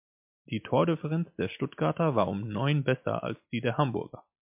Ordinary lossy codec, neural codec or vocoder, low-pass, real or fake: MP3, 32 kbps; none; 3.6 kHz; real